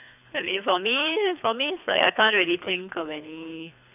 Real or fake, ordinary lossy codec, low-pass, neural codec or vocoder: fake; none; 3.6 kHz; codec, 24 kHz, 3 kbps, HILCodec